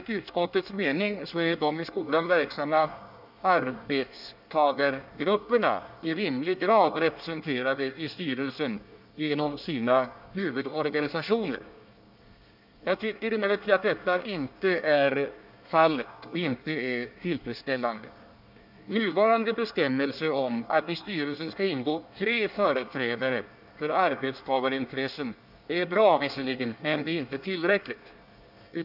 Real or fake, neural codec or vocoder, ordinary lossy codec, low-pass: fake; codec, 24 kHz, 1 kbps, SNAC; none; 5.4 kHz